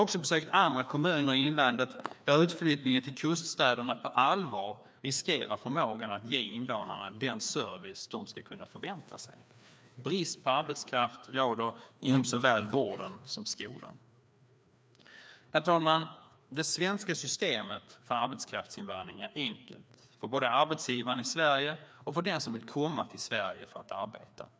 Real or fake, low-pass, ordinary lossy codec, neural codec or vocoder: fake; none; none; codec, 16 kHz, 2 kbps, FreqCodec, larger model